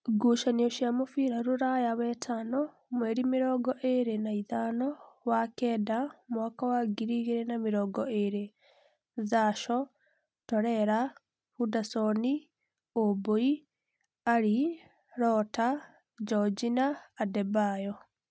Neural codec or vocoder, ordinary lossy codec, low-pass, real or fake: none; none; none; real